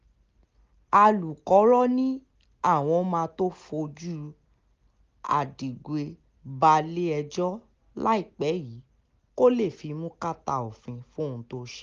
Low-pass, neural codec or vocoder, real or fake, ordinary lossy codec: 7.2 kHz; none; real; Opus, 32 kbps